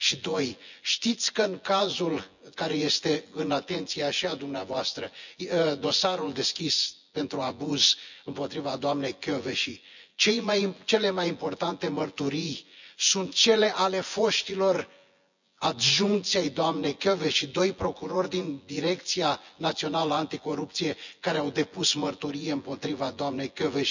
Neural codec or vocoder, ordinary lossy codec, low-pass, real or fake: vocoder, 24 kHz, 100 mel bands, Vocos; none; 7.2 kHz; fake